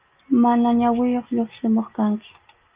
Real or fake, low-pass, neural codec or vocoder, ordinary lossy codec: real; 3.6 kHz; none; Opus, 32 kbps